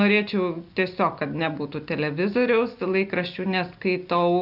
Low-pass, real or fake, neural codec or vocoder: 5.4 kHz; real; none